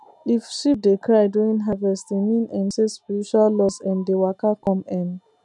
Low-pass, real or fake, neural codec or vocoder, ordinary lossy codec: 10.8 kHz; real; none; none